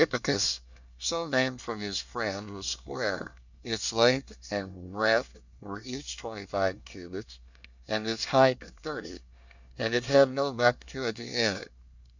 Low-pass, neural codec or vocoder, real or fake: 7.2 kHz; codec, 24 kHz, 1 kbps, SNAC; fake